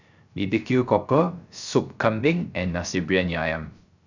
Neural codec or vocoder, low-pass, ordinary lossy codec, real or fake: codec, 16 kHz, 0.3 kbps, FocalCodec; 7.2 kHz; none; fake